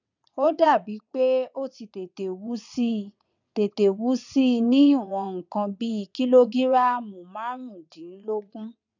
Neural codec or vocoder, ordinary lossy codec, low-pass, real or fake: vocoder, 22.05 kHz, 80 mel bands, WaveNeXt; none; 7.2 kHz; fake